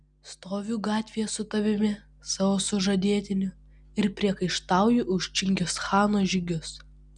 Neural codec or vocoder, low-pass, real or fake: none; 9.9 kHz; real